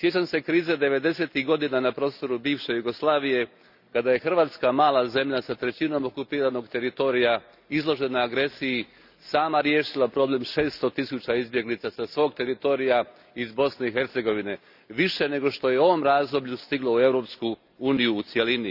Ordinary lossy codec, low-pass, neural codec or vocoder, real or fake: none; 5.4 kHz; none; real